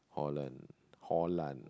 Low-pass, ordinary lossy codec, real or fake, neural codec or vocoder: none; none; real; none